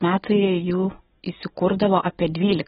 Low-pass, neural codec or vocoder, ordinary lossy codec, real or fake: 19.8 kHz; none; AAC, 16 kbps; real